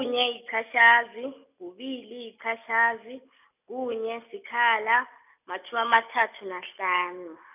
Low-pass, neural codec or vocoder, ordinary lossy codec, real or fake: 3.6 kHz; none; none; real